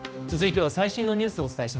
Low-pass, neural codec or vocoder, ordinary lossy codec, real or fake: none; codec, 16 kHz, 1 kbps, X-Codec, HuBERT features, trained on general audio; none; fake